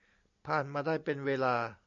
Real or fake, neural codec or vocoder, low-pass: real; none; 7.2 kHz